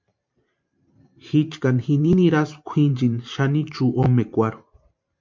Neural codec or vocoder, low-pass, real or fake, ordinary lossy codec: vocoder, 44.1 kHz, 128 mel bands every 512 samples, BigVGAN v2; 7.2 kHz; fake; MP3, 48 kbps